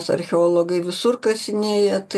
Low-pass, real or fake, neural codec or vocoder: 14.4 kHz; real; none